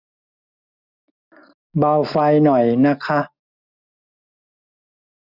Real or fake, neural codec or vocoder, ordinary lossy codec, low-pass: real; none; none; 5.4 kHz